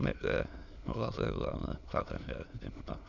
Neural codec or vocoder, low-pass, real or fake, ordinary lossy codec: autoencoder, 22.05 kHz, a latent of 192 numbers a frame, VITS, trained on many speakers; 7.2 kHz; fake; none